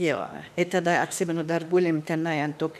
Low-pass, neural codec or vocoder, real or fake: 14.4 kHz; autoencoder, 48 kHz, 32 numbers a frame, DAC-VAE, trained on Japanese speech; fake